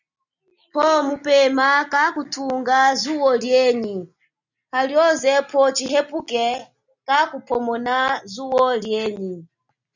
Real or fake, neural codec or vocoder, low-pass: real; none; 7.2 kHz